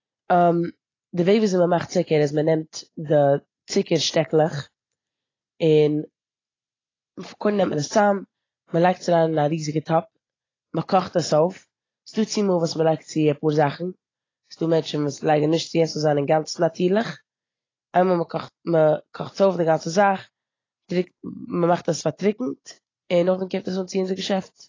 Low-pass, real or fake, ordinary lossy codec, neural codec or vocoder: 7.2 kHz; real; AAC, 32 kbps; none